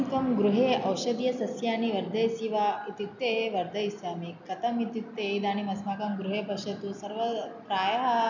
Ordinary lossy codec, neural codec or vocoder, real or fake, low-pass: none; none; real; 7.2 kHz